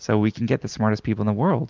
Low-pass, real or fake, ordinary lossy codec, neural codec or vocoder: 7.2 kHz; real; Opus, 32 kbps; none